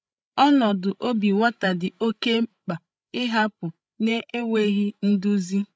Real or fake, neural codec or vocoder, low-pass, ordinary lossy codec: fake; codec, 16 kHz, 16 kbps, FreqCodec, larger model; none; none